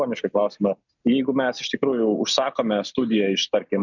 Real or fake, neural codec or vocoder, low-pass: real; none; 7.2 kHz